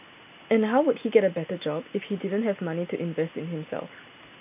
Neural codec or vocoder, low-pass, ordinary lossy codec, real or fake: none; 3.6 kHz; AAC, 32 kbps; real